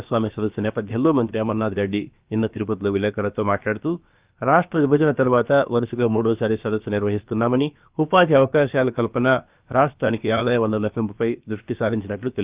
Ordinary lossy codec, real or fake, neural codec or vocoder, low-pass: Opus, 32 kbps; fake; codec, 16 kHz, about 1 kbps, DyCAST, with the encoder's durations; 3.6 kHz